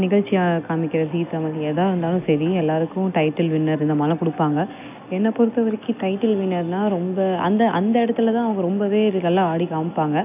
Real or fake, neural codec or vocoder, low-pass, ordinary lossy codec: real; none; 3.6 kHz; AAC, 32 kbps